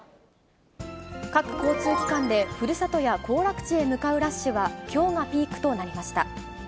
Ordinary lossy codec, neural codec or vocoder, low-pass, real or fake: none; none; none; real